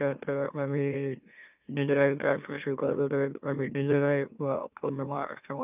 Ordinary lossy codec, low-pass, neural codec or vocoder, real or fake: none; 3.6 kHz; autoencoder, 44.1 kHz, a latent of 192 numbers a frame, MeloTTS; fake